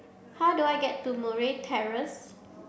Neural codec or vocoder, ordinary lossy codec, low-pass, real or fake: none; none; none; real